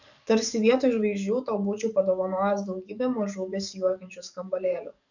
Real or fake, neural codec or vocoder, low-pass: fake; codec, 44.1 kHz, 7.8 kbps, DAC; 7.2 kHz